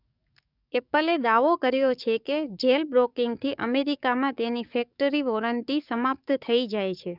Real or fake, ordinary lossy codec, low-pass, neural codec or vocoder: fake; none; 5.4 kHz; codec, 44.1 kHz, 7.8 kbps, DAC